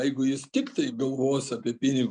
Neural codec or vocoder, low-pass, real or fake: none; 9.9 kHz; real